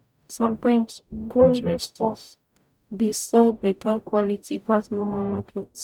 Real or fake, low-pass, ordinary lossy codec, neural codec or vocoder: fake; 19.8 kHz; none; codec, 44.1 kHz, 0.9 kbps, DAC